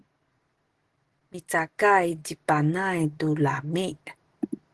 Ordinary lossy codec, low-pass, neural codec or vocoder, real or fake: Opus, 16 kbps; 10.8 kHz; none; real